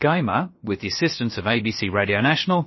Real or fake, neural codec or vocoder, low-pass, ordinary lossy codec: fake; codec, 16 kHz, about 1 kbps, DyCAST, with the encoder's durations; 7.2 kHz; MP3, 24 kbps